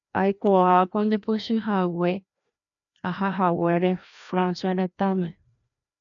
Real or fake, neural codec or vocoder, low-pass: fake; codec, 16 kHz, 1 kbps, FreqCodec, larger model; 7.2 kHz